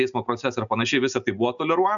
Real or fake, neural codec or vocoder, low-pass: real; none; 7.2 kHz